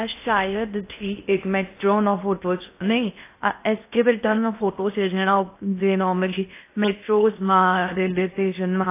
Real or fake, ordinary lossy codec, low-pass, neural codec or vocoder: fake; AAC, 24 kbps; 3.6 kHz; codec, 16 kHz in and 24 kHz out, 0.6 kbps, FocalCodec, streaming, 4096 codes